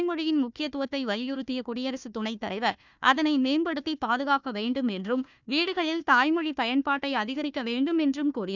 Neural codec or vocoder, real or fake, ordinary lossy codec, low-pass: codec, 16 kHz, 1 kbps, FunCodec, trained on Chinese and English, 50 frames a second; fake; none; 7.2 kHz